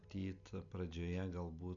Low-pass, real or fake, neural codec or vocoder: 7.2 kHz; real; none